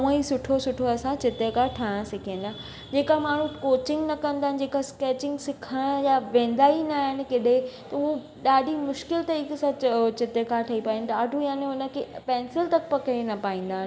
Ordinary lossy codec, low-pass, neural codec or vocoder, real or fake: none; none; none; real